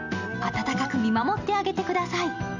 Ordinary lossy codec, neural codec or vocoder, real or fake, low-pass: none; none; real; 7.2 kHz